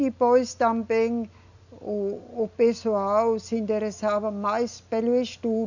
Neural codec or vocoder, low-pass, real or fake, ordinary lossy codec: none; 7.2 kHz; real; none